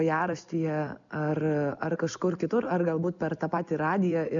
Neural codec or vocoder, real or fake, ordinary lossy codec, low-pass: none; real; MP3, 96 kbps; 7.2 kHz